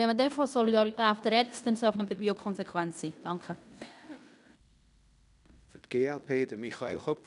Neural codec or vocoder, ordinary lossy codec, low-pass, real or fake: codec, 16 kHz in and 24 kHz out, 0.9 kbps, LongCat-Audio-Codec, fine tuned four codebook decoder; none; 10.8 kHz; fake